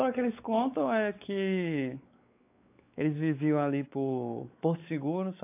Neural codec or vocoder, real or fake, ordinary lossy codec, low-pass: codec, 16 kHz, 4 kbps, X-Codec, WavLM features, trained on Multilingual LibriSpeech; fake; AAC, 24 kbps; 3.6 kHz